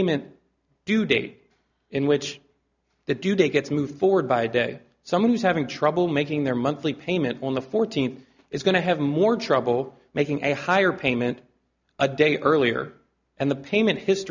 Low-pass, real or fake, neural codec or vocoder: 7.2 kHz; real; none